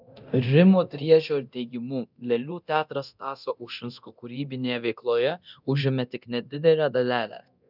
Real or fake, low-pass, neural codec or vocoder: fake; 5.4 kHz; codec, 24 kHz, 0.9 kbps, DualCodec